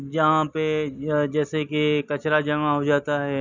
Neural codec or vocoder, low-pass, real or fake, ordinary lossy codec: none; 7.2 kHz; real; none